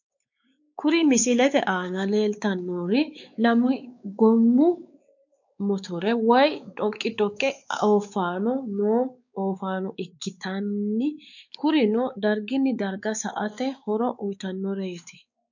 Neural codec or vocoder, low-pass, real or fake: codec, 16 kHz, 4 kbps, X-Codec, WavLM features, trained on Multilingual LibriSpeech; 7.2 kHz; fake